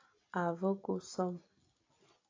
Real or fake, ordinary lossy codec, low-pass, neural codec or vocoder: real; AAC, 32 kbps; 7.2 kHz; none